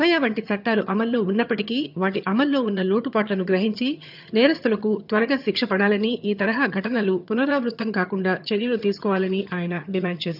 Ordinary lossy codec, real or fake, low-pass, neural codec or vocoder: none; fake; 5.4 kHz; vocoder, 22.05 kHz, 80 mel bands, HiFi-GAN